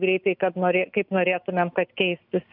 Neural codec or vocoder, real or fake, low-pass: none; real; 5.4 kHz